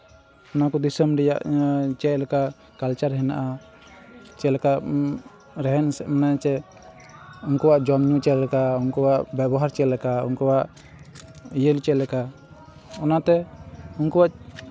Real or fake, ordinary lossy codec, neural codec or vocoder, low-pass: real; none; none; none